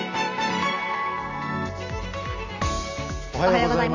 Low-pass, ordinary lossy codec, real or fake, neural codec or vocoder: 7.2 kHz; none; real; none